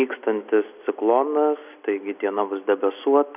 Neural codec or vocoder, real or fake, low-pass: none; real; 3.6 kHz